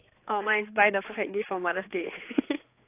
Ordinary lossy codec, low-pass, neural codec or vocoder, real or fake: AAC, 24 kbps; 3.6 kHz; codec, 16 kHz, 4 kbps, X-Codec, HuBERT features, trained on general audio; fake